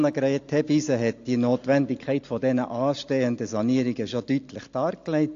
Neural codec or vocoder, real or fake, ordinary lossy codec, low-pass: none; real; AAC, 48 kbps; 7.2 kHz